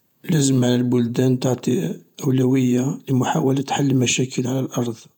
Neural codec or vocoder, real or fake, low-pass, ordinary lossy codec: vocoder, 48 kHz, 128 mel bands, Vocos; fake; 19.8 kHz; none